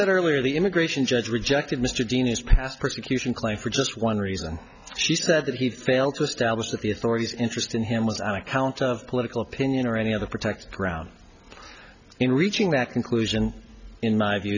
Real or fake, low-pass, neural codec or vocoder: real; 7.2 kHz; none